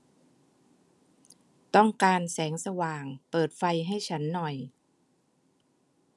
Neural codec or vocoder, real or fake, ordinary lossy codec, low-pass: none; real; none; none